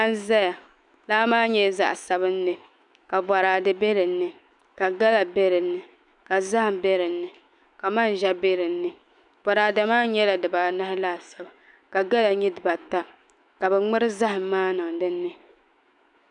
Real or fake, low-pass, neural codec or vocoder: fake; 10.8 kHz; autoencoder, 48 kHz, 128 numbers a frame, DAC-VAE, trained on Japanese speech